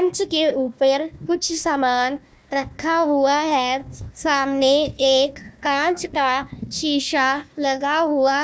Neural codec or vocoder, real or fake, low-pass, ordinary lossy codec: codec, 16 kHz, 1 kbps, FunCodec, trained on Chinese and English, 50 frames a second; fake; none; none